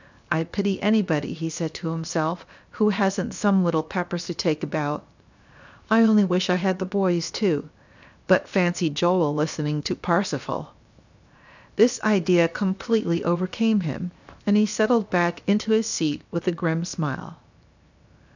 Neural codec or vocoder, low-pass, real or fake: codec, 16 kHz, 0.7 kbps, FocalCodec; 7.2 kHz; fake